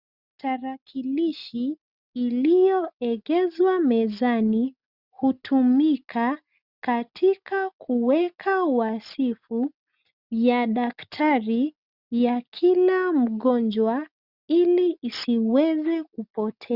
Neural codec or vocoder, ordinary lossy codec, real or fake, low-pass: none; Opus, 64 kbps; real; 5.4 kHz